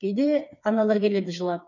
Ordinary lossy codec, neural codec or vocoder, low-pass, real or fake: none; codec, 16 kHz, 4 kbps, FreqCodec, smaller model; 7.2 kHz; fake